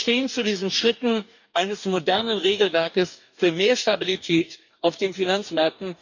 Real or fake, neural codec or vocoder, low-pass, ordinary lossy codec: fake; codec, 44.1 kHz, 2.6 kbps, DAC; 7.2 kHz; none